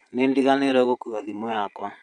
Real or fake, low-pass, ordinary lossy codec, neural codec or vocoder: fake; 9.9 kHz; none; vocoder, 22.05 kHz, 80 mel bands, Vocos